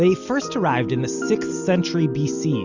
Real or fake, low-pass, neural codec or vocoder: real; 7.2 kHz; none